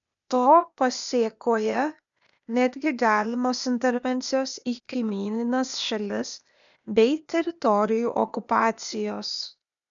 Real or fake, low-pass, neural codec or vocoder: fake; 7.2 kHz; codec, 16 kHz, 0.8 kbps, ZipCodec